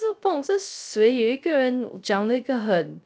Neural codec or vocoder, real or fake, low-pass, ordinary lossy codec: codec, 16 kHz, 0.3 kbps, FocalCodec; fake; none; none